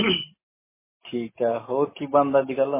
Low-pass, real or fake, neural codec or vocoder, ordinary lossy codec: 3.6 kHz; real; none; MP3, 16 kbps